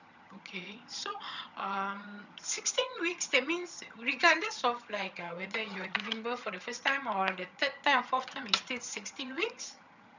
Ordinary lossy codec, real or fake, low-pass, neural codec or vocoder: none; fake; 7.2 kHz; vocoder, 22.05 kHz, 80 mel bands, HiFi-GAN